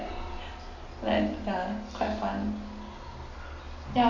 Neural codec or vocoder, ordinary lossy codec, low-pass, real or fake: none; none; 7.2 kHz; real